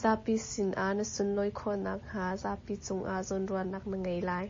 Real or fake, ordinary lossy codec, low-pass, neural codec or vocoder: real; MP3, 32 kbps; 7.2 kHz; none